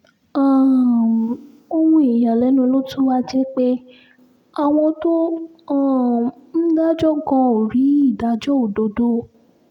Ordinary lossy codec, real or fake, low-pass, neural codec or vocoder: none; real; 19.8 kHz; none